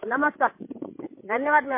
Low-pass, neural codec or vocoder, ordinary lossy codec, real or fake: 3.6 kHz; codec, 16 kHz, 16 kbps, FreqCodec, larger model; MP3, 24 kbps; fake